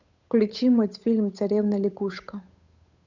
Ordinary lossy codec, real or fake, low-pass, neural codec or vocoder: none; fake; 7.2 kHz; codec, 16 kHz, 8 kbps, FunCodec, trained on Chinese and English, 25 frames a second